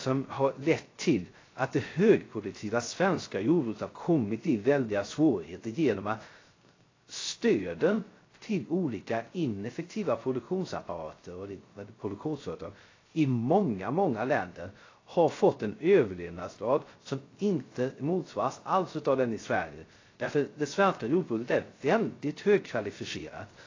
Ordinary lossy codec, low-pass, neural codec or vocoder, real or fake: AAC, 32 kbps; 7.2 kHz; codec, 16 kHz, 0.3 kbps, FocalCodec; fake